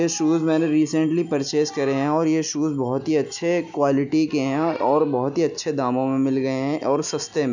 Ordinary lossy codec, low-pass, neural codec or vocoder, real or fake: none; 7.2 kHz; none; real